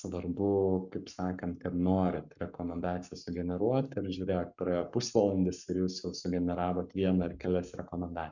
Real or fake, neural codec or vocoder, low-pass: fake; codec, 44.1 kHz, 7.8 kbps, Pupu-Codec; 7.2 kHz